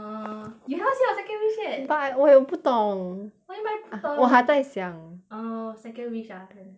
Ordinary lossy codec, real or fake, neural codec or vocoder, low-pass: none; real; none; none